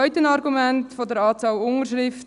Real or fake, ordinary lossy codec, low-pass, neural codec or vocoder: real; none; 10.8 kHz; none